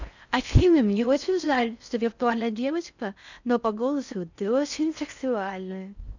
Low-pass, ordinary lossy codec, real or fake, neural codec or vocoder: 7.2 kHz; none; fake; codec, 16 kHz in and 24 kHz out, 0.6 kbps, FocalCodec, streaming, 4096 codes